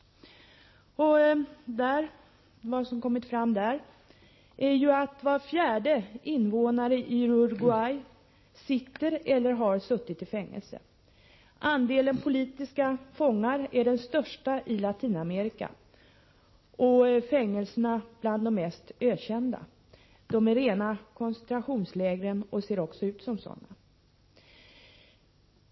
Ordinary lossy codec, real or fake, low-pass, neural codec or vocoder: MP3, 24 kbps; real; 7.2 kHz; none